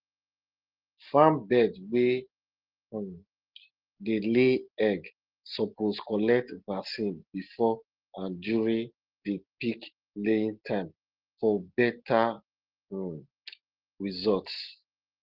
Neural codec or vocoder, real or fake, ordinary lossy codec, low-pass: none; real; Opus, 16 kbps; 5.4 kHz